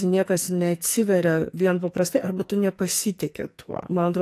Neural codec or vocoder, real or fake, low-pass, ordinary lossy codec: codec, 44.1 kHz, 2.6 kbps, SNAC; fake; 14.4 kHz; AAC, 64 kbps